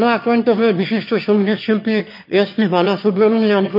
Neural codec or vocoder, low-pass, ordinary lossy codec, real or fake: autoencoder, 22.05 kHz, a latent of 192 numbers a frame, VITS, trained on one speaker; 5.4 kHz; MP3, 32 kbps; fake